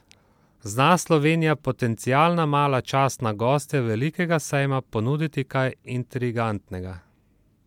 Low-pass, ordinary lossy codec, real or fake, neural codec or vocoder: 19.8 kHz; MP3, 96 kbps; real; none